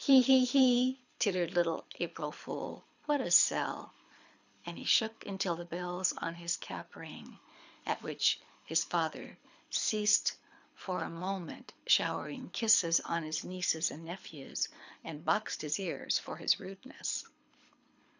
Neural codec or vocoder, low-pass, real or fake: codec, 24 kHz, 6 kbps, HILCodec; 7.2 kHz; fake